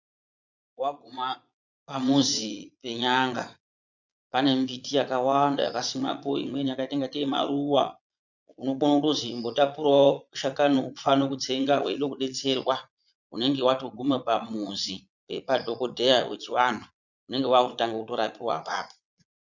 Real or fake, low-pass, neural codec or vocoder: fake; 7.2 kHz; vocoder, 22.05 kHz, 80 mel bands, Vocos